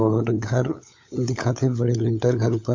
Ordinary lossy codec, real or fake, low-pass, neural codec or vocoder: MP3, 48 kbps; fake; 7.2 kHz; codec, 16 kHz, 16 kbps, FunCodec, trained on LibriTTS, 50 frames a second